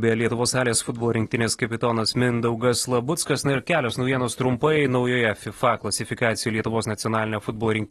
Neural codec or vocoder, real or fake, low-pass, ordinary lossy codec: none; real; 19.8 kHz; AAC, 32 kbps